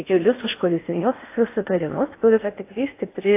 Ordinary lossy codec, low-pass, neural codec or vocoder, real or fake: AAC, 24 kbps; 3.6 kHz; codec, 16 kHz in and 24 kHz out, 0.6 kbps, FocalCodec, streaming, 4096 codes; fake